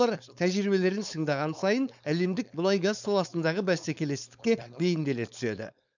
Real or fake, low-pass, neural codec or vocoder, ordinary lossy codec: fake; 7.2 kHz; codec, 16 kHz, 4.8 kbps, FACodec; none